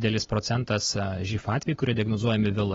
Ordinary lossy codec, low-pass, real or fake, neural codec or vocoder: AAC, 24 kbps; 7.2 kHz; real; none